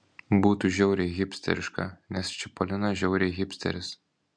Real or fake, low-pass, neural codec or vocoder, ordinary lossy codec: real; 9.9 kHz; none; MP3, 64 kbps